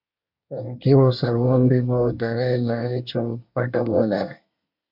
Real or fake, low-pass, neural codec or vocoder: fake; 5.4 kHz; codec, 24 kHz, 1 kbps, SNAC